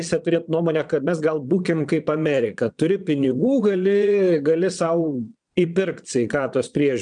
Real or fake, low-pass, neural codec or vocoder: fake; 9.9 kHz; vocoder, 22.05 kHz, 80 mel bands, Vocos